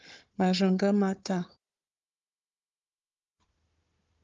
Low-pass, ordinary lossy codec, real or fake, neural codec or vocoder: 7.2 kHz; Opus, 32 kbps; fake; codec, 16 kHz, 4 kbps, FunCodec, trained on Chinese and English, 50 frames a second